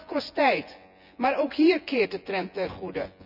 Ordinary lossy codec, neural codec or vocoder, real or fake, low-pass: none; vocoder, 24 kHz, 100 mel bands, Vocos; fake; 5.4 kHz